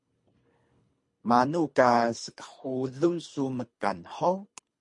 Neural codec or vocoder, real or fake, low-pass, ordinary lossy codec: codec, 24 kHz, 3 kbps, HILCodec; fake; 10.8 kHz; MP3, 48 kbps